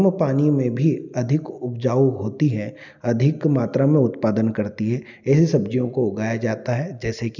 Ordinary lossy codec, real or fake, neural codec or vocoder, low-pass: none; real; none; 7.2 kHz